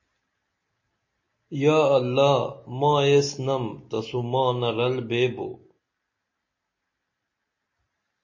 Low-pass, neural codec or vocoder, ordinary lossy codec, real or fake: 7.2 kHz; none; MP3, 32 kbps; real